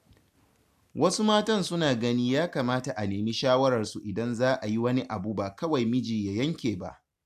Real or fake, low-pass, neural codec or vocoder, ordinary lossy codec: real; 14.4 kHz; none; AAC, 96 kbps